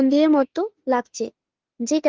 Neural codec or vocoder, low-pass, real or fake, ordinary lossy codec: autoencoder, 48 kHz, 32 numbers a frame, DAC-VAE, trained on Japanese speech; 7.2 kHz; fake; Opus, 16 kbps